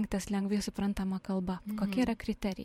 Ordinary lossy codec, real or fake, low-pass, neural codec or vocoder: MP3, 64 kbps; fake; 19.8 kHz; vocoder, 44.1 kHz, 128 mel bands every 512 samples, BigVGAN v2